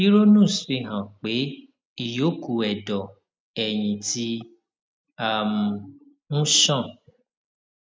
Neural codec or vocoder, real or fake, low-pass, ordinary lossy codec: none; real; none; none